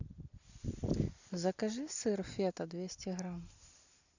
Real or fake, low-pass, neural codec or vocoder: real; 7.2 kHz; none